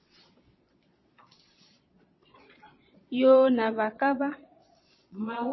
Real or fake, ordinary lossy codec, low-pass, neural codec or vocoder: fake; MP3, 24 kbps; 7.2 kHz; vocoder, 22.05 kHz, 80 mel bands, Vocos